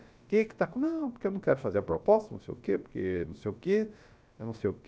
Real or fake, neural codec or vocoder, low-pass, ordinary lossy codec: fake; codec, 16 kHz, about 1 kbps, DyCAST, with the encoder's durations; none; none